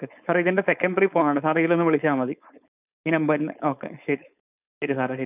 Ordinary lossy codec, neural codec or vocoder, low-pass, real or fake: none; codec, 16 kHz, 4.8 kbps, FACodec; 3.6 kHz; fake